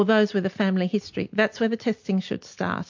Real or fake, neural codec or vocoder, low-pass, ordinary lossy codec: real; none; 7.2 kHz; MP3, 48 kbps